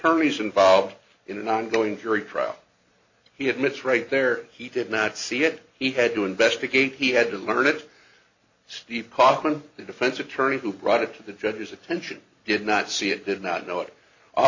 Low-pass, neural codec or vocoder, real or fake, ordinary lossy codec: 7.2 kHz; none; real; AAC, 48 kbps